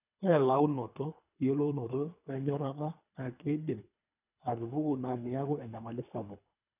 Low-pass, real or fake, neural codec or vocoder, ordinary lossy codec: 3.6 kHz; fake; codec, 24 kHz, 3 kbps, HILCodec; none